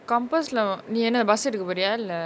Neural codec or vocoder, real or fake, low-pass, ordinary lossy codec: none; real; none; none